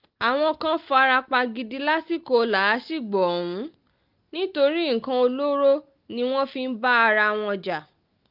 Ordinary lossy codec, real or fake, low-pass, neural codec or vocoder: Opus, 32 kbps; real; 5.4 kHz; none